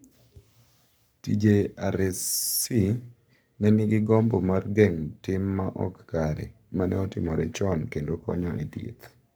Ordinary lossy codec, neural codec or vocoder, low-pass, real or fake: none; codec, 44.1 kHz, 7.8 kbps, Pupu-Codec; none; fake